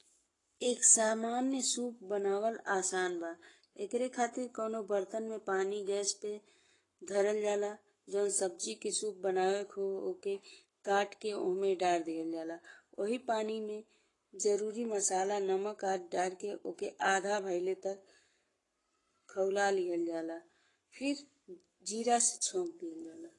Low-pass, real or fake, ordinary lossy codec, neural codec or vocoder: 10.8 kHz; fake; AAC, 32 kbps; codec, 44.1 kHz, 7.8 kbps, Pupu-Codec